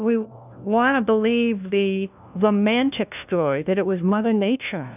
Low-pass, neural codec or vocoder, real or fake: 3.6 kHz; codec, 16 kHz, 1 kbps, FunCodec, trained on LibriTTS, 50 frames a second; fake